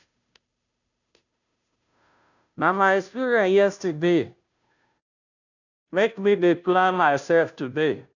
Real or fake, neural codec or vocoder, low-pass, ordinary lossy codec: fake; codec, 16 kHz, 0.5 kbps, FunCodec, trained on Chinese and English, 25 frames a second; 7.2 kHz; none